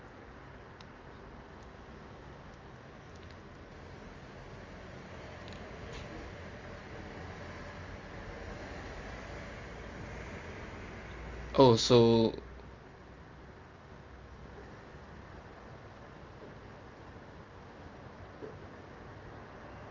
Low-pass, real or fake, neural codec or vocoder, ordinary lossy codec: 7.2 kHz; real; none; Opus, 32 kbps